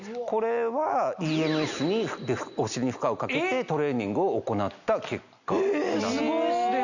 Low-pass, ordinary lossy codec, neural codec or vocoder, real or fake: 7.2 kHz; none; none; real